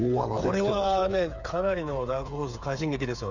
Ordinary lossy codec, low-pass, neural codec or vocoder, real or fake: none; 7.2 kHz; codec, 16 kHz, 8 kbps, FreqCodec, smaller model; fake